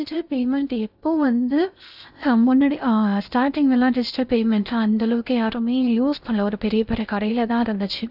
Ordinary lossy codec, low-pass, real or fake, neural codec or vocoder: none; 5.4 kHz; fake; codec, 16 kHz in and 24 kHz out, 0.8 kbps, FocalCodec, streaming, 65536 codes